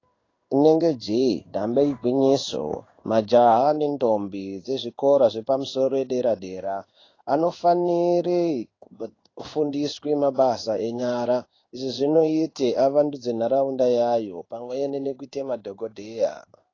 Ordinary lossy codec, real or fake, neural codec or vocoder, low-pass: AAC, 32 kbps; fake; codec, 16 kHz in and 24 kHz out, 1 kbps, XY-Tokenizer; 7.2 kHz